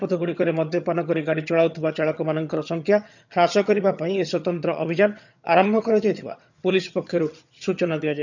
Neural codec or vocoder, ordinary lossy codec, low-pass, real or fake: vocoder, 22.05 kHz, 80 mel bands, HiFi-GAN; none; 7.2 kHz; fake